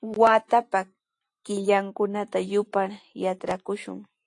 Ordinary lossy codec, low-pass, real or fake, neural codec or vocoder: AAC, 48 kbps; 10.8 kHz; real; none